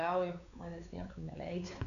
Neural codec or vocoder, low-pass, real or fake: codec, 16 kHz, 4 kbps, X-Codec, WavLM features, trained on Multilingual LibriSpeech; 7.2 kHz; fake